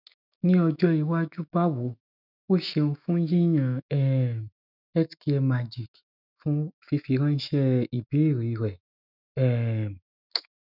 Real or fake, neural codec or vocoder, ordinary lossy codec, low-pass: fake; autoencoder, 48 kHz, 128 numbers a frame, DAC-VAE, trained on Japanese speech; none; 5.4 kHz